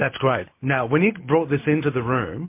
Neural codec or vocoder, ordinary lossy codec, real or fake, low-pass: none; MP3, 24 kbps; real; 3.6 kHz